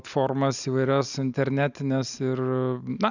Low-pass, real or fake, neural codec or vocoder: 7.2 kHz; real; none